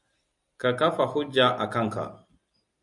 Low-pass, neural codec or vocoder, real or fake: 10.8 kHz; none; real